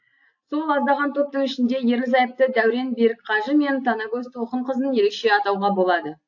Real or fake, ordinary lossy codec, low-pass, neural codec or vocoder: real; AAC, 48 kbps; 7.2 kHz; none